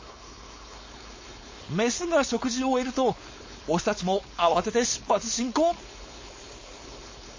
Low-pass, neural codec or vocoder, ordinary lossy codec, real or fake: 7.2 kHz; codec, 16 kHz, 4.8 kbps, FACodec; MP3, 32 kbps; fake